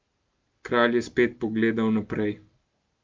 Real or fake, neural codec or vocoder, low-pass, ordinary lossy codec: real; none; 7.2 kHz; Opus, 32 kbps